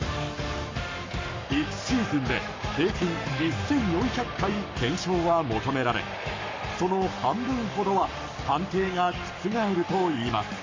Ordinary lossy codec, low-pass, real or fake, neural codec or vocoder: MP3, 48 kbps; 7.2 kHz; fake; codec, 44.1 kHz, 7.8 kbps, Pupu-Codec